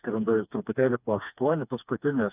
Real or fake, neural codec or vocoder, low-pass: fake; codec, 16 kHz, 2 kbps, FreqCodec, smaller model; 3.6 kHz